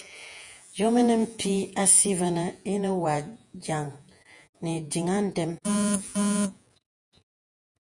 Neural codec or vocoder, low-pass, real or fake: vocoder, 48 kHz, 128 mel bands, Vocos; 10.8 kHz; fake